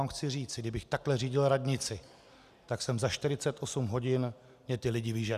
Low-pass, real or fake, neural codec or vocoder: 14.4 kHz; real; none